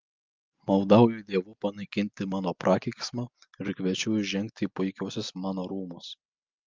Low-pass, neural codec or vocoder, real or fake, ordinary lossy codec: 7.2 kHz; none; real; Opus, 24 kbps